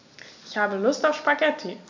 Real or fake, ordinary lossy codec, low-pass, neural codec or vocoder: real; MP3, 64 kbps; 7.2 kHz; none